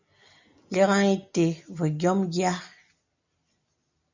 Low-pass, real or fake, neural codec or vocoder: 7.2 kHz; real; none